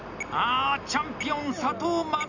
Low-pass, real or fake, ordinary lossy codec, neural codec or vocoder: 7.2 kHz; real; none; none